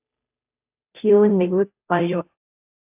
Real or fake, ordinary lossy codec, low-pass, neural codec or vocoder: fake; AAC, 32 kbps; 3.6 kHz; codec, 16 kHz, 0.5 kbps, FunCodec, trained on Chinese and English, 25 frames a second